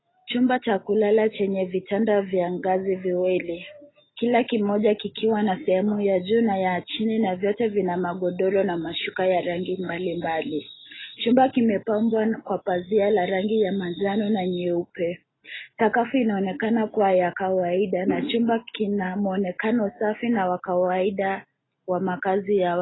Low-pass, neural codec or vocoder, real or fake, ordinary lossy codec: 7.2 kHz; none; real; AAC, 16 kbps